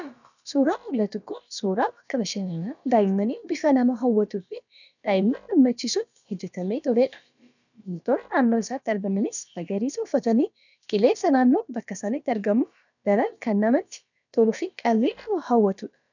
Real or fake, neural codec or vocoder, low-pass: fake; codec, 16 kHz, about 1 kbps, DyCAST, with the encoder's durations; 7.2 kHz